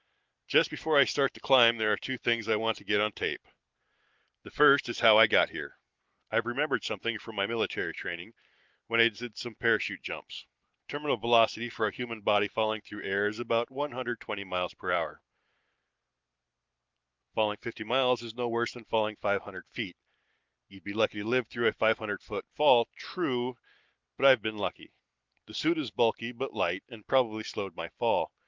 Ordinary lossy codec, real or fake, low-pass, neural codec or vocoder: Opus, 32 kbps; real; 7.2 kHz; none